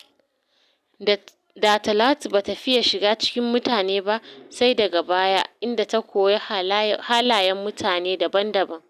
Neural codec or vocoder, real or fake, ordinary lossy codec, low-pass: none; real; none; 14.4 kHz